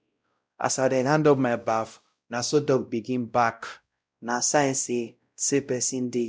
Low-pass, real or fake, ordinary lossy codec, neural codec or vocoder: none; fake; none; codec, 16 kHz, 0.5 kbps, X-Codec, WavLM features, trained on Multilingual LibriSpeech